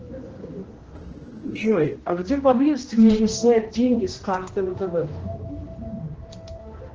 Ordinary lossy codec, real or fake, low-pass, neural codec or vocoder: Opus, 16 kbps; fake; 7.2 kHz; codec, 16 kHz, 1 kbps, X-Codec, HuBERT features, trained on general audio